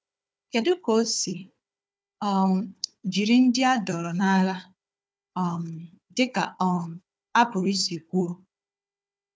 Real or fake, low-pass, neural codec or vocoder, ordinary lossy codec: fake; none; codec, 16 kHz, 4 kbps, FunCodec, trained on Chinese and English, 50 frames a second; none